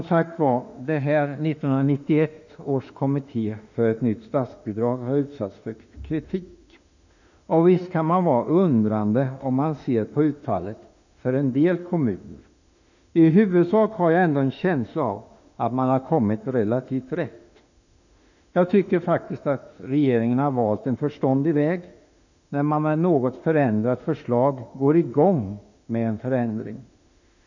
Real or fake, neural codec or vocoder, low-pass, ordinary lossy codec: fake; autoencoder, 48 kHz, 32 numbers a frame, DAC-VAE, trained on Japanese speech; 7.2 kHz; none